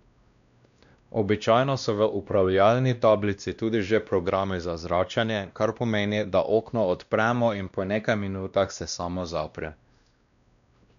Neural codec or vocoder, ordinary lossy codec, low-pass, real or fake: codec, 16 kHz, 1 kbps, X-Codec, WavLM features, trained on Multilingual LibriSpeech; none; 7.2 kHz; fake